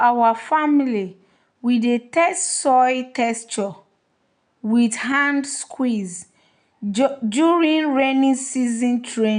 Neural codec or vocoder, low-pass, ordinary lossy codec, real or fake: none; 9.9 kHz; none; real